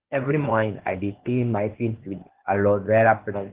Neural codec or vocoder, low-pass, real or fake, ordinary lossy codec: codec, 16 kHz, 0.8 kbps, ZipCodec; 3.6 kHz; fake; Opus, 16 kbps